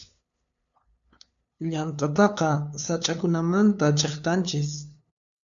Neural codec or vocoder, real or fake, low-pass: codec, 16 kHz, 2 kbps, FunCodec, trained on LibriTTS, 25 frames a second; fake; 7.2 kHz